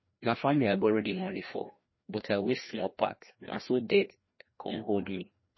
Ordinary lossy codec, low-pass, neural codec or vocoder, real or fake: MP3, 24 kbps; 7.2 kHz; codec, 16 kHz, 1 kbps, FreqCodec, larger model; fake